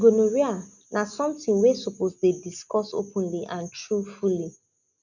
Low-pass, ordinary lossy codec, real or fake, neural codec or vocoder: 7.2 kHz; none; real; none